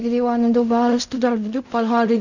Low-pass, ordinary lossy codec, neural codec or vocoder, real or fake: 7.2 kHz; none; codec, 16 kHz in and 24 kHz out, 0.4 kbps, LongCat-Audio-Codec, fine tuned four codebook decoder; fake